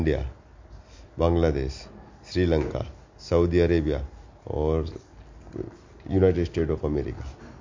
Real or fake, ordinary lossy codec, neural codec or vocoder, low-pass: real; MP3, 48 kbps; none; 7.2 kHz